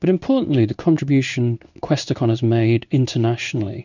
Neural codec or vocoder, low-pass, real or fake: codec, 16 kHz in and 24 kHz out, 1 kbps, XY-Tokenizer; 7.2 kHz; fake